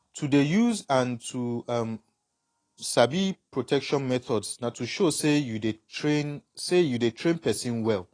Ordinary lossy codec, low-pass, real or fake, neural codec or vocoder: AAC, 32 kbps; 9.9 kHz; real; none